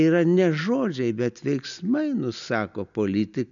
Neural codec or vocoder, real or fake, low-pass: none; real; 7.2 kHz